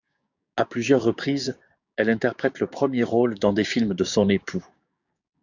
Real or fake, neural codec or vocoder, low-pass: fake; codec, 16 kHz, 6 kbps, DAC; 7.2 kHz